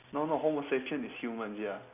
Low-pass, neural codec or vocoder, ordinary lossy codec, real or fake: 3.6 kHz; none; none; real